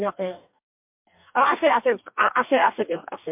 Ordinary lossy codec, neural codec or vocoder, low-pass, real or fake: MP3, 32 kbps; codec, 44.1 kHz, 2.6 kbps, DAC; 3.6 kHz; fake